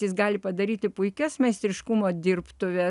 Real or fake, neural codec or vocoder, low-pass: real; none; 10.8 kHz